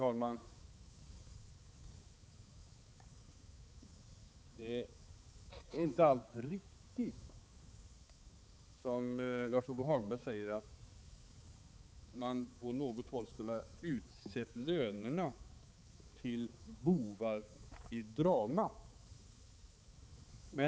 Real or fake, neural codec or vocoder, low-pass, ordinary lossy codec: fake; codec, 16 kHz, 4 kbps, X-Codec, HuBERT features, trained on balanced general audio; none; none